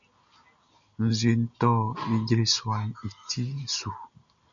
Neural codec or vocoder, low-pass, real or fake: none; 7.2 kHz; real